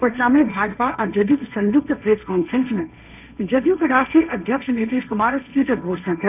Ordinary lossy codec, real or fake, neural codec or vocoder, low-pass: none; fake; codec, 16 kHz, 1.1 kbps, Voila-Tokenizer; 3.6 kHz